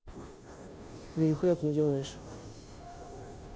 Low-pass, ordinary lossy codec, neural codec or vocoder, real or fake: none; none; codec, 16 kHz, 0.5 kbps, FunCodec, trained on Chinese and English, 25 frames a second; fake